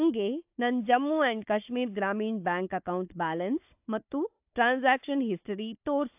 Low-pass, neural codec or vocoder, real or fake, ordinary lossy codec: 3.6 kHz; codec, 16 kHz, 4 kbps, FunCodec, trained on Chinese and English, 50 frames a second; fake; AAC, 32 kbps